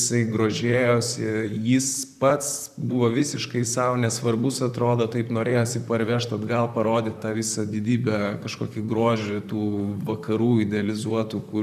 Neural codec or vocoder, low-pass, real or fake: vocoder, 44.1 kHz, 128 mel bands, Pupu-Vocoder; 14.4 kHz; fake